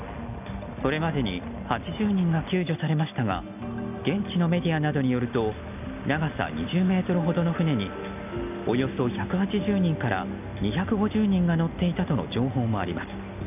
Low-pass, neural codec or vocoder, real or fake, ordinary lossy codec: 3.6 kHz; none; real; none